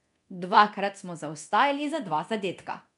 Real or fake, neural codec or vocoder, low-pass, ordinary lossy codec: fake; codec, 24 kHz, 0.9 kbps, DualCodec; 10.8 kHz; none